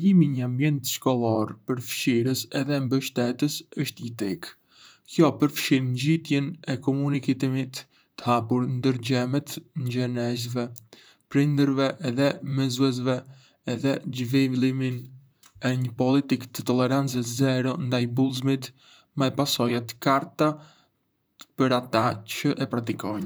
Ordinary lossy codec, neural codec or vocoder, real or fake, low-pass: none; vocoder, 44.1 kHz, 128 mel bands, Pupu-Vocoder; fake; none